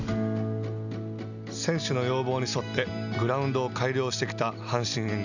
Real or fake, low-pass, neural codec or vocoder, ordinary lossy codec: real; 7.2 kHz; none; none